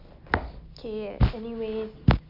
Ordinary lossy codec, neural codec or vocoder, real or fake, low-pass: none; none; real; 5.4 kHz